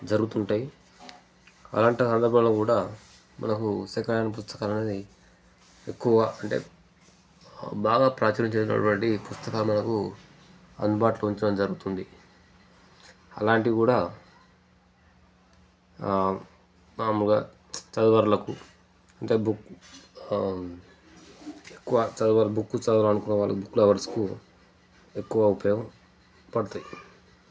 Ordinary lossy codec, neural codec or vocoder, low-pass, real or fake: none; none; none; real